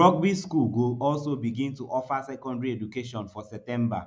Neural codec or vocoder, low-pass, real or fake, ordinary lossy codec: none; none; real; none